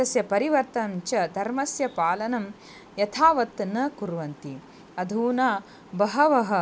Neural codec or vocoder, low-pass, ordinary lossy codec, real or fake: none; none; none; real